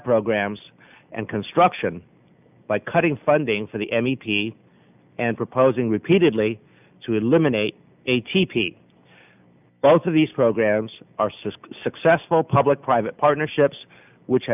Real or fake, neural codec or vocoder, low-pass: real; none; 3.6 kHz